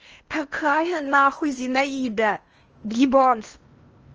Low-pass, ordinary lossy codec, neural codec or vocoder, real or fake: 7.2 kHz; Opus, 24 kbps; codec, 16 kHz in and 24 kHz out, 0.8 kbps, FocalCodec, streaming, 65536 codes; fake